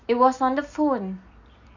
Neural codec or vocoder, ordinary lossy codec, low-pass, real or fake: none; none; 7.2 kHz; real